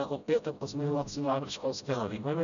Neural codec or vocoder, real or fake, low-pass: codec, 16 kHz, 0.5 kbps, FreqCodec, smaller model; fake; 7.2 kHz